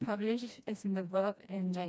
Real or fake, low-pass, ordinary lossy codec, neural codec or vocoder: fake; none; none; codec, 16 kHz, 1 kbps, FreqCodec, smaller model